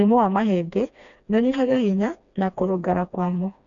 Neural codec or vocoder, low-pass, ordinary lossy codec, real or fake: codec, 16 kHz, 2 kbps, FreqCodec, smaller model; 7.2 kHz; none; fake